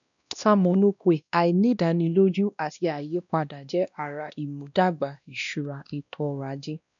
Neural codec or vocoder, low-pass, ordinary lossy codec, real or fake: codec, 16 kHz, 1 kbps, X-Codec, WavLM features, trained on Multilingual LibriSpeech; 7.2 kHz; none; fake